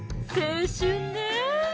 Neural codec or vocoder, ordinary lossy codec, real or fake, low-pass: none; none; real; none